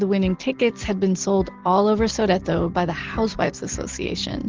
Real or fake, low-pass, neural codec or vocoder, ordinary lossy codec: real; 7.2 kHz; none; Opus, 16 kbps